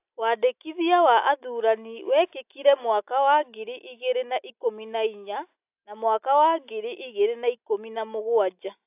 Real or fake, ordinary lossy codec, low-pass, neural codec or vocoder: real; AAC, 32 kbps; 3.6 kHz; none